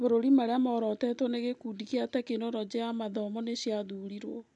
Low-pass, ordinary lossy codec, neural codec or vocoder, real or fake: 10.8 kHz; none; none; real